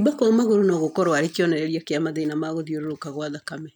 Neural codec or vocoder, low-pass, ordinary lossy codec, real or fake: none; 19.8 kHz; none; real